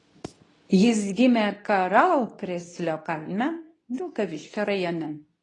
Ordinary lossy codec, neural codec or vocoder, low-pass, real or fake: AAC, 32 kbps; codec, 24 kHz, 0.9 kbps, WavTokenizer, medium speech release version 2; 10.8 kHz; fake